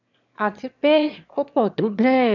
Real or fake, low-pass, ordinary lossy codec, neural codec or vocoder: fake; 7.2 kHz; none; autoencoder, 22.05 kHz, a latent of 192 numbers a frame, VITS, trained on one speaker